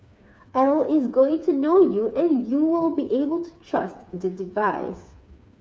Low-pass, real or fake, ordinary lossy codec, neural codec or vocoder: none; fake; none; codec, 16 kHz, 4 kbps, FreqCodec, smaller model